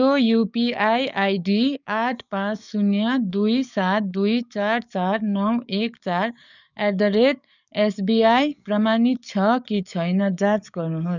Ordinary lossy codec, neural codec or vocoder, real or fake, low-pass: none; codec, 44.1 kHz, 7.8 kbps, DAC; fake; 7.2 kHz